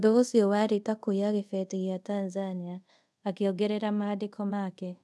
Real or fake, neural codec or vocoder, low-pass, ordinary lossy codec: fake; codec, 24 kHz, 0.5 kbps, DualCodec; none; none